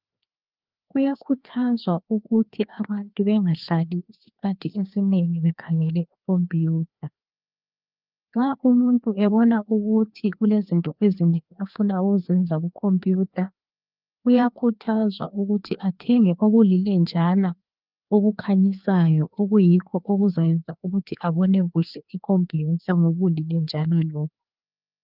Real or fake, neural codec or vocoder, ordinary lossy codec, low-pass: fake; codec, 16 kHz, 2 kbps, FreqCodec, larger model; Opus, 32 kbps; 5.4 kHz